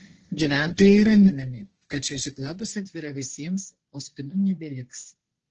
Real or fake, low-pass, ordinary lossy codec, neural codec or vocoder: fake; 7.2 kHz; Opus, 16 kbps; codec, 16 kHz, 1.1 kbps, Voila-Tokenizer